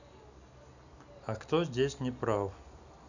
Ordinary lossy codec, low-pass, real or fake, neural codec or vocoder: none; 7.2 kHz; real; none